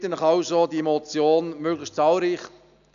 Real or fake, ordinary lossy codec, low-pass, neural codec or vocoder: real; none; 7.2 kHz; none